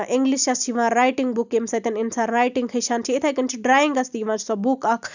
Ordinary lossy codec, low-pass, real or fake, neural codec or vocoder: none; 7.2 kHz; real; none